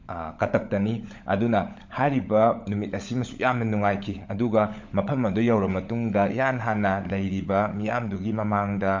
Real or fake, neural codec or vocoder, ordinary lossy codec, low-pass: fake; codec, 16 kHz, 16 kbps, FunCodec, trained on LibriTTS, 50 frames a second; MP3, 64 kbps; 7.2 kHz